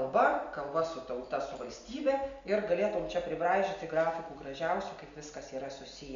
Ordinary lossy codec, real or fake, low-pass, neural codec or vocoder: Opus, 64 kbps; real; 7.2 kHz; none